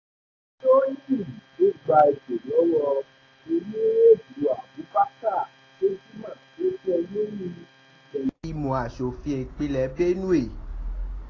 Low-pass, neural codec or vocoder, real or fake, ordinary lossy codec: 7.2 kHz; none; real; AAC, 32 kbps